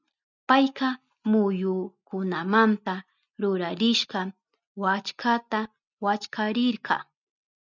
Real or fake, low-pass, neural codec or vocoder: real; 7.2 kHz; none